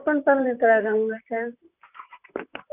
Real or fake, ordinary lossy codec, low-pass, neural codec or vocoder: fake; none; 3.6 kHz; vocoder, 44.1 kHz, 128 mel bands, Pupu-Vocoder